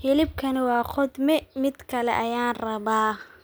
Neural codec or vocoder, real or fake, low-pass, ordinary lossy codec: none; real; none; none